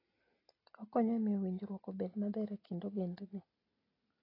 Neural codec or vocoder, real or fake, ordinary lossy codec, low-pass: none; real; AAC, 24 kbps; 5.4 kHz